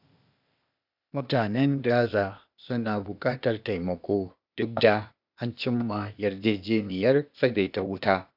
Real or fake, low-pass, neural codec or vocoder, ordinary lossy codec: fake; 5.4 kHz; codec, 16 kHz, 0.8 kbps, ZipCodec; none